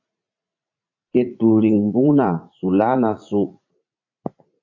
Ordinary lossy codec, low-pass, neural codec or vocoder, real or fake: AAC, 48 kbps; 7.2 kHz; vocoder, 44.1 kHz, 80 mel bands, Vocos; fake